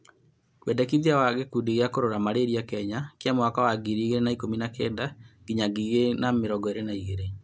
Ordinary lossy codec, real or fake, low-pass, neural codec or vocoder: none; real; none; none